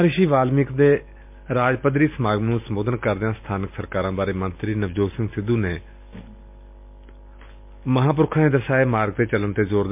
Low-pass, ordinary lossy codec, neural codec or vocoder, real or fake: 3.6 kHz; MP3, 32 kbps; none; real